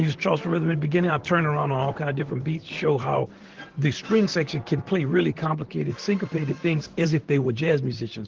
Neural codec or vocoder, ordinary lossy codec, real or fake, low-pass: vocoder, 44.1 kHz, 128 mel bands, Pupu-Vocoder; Opus, 16 kbps; fake; 7.2 kHz